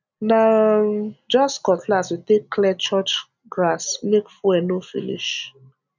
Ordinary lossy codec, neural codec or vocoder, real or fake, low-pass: none; none; real; 7.2 kHz